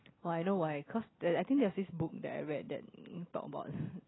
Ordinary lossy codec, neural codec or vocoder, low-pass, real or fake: AAC, 16 kbps; none; 7.2 kHz; real